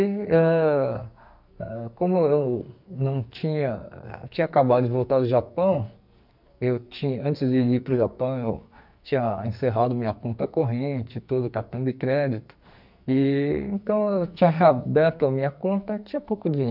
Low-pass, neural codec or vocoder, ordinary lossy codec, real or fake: 5.4 kHz; codec, 44.1 kHz, 2.6 kbps, SNAC; none; fake